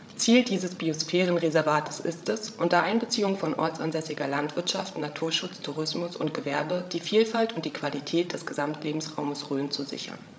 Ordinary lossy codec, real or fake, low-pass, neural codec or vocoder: none; fake; none; codec, 16 kHz, 16 kbps, FreqCodec, larger model